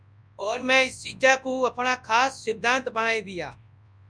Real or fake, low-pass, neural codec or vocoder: fake; 9.9 kHz; codec, 24 kHz, 0.9 kbps, WavTokenizer, large speech release